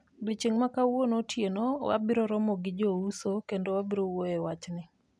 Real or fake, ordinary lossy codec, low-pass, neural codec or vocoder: real; none; none; none